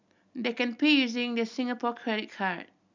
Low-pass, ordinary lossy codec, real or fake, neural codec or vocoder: 7.2 kHz; none; real; none